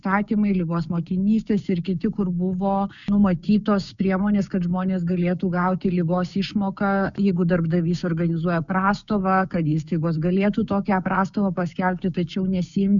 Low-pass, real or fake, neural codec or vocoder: 7.2 kHz; fake; codec, 16 kHz, 6 kbps, DAC